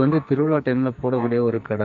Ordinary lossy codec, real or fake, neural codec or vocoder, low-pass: none; fake; codec, 44.1 kHz, 2.6 kbps, SNAC; 7.2 kHz